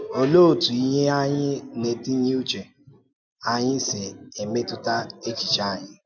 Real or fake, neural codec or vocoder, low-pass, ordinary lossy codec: real; none; 7.2 kHz; none